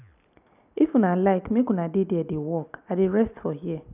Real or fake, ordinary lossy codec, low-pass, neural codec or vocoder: real; none; 3.6 kHz; none